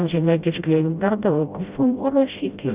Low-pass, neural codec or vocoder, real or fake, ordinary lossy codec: 3.6 kHz; codec, 16 kHz, 0.5 kbps, FreqCodec, smaller model; fake; Opus, 64 kbps